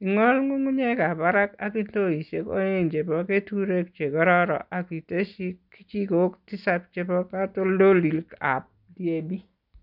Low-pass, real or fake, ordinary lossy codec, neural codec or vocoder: 5.4 kHz; real; none; none